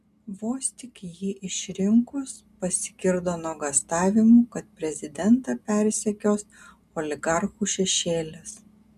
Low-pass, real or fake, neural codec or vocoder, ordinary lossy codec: 14.4 kHz; real; none; MP3, 96 kbps